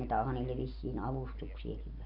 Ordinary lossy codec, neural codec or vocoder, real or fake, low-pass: none; none; real; 5.4 kHz